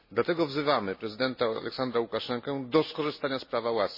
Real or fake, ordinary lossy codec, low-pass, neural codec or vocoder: real; MP3, 24 kbps; 5.4 kHz; none